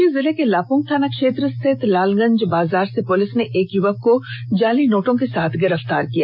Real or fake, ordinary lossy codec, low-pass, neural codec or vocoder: real; none; 5.4 kHz; none